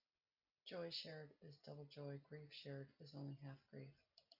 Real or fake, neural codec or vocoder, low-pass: real; none; 5.4 kHz